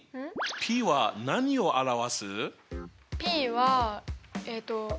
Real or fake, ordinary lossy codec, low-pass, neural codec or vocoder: real; none; none; none